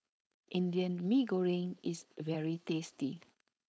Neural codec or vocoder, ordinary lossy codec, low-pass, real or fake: codec, 16 kHz, 4.8 kbps, FACodec; none; none; fake